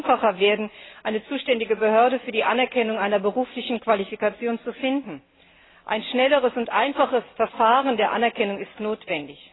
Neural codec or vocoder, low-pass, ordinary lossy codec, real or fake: none; 7.2 kHz; AAC, 16 kbps; real